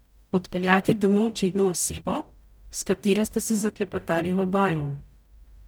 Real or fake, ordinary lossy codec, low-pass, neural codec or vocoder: fake; none; none; codec, 44.1 kHz, 0.9 kbps, DAC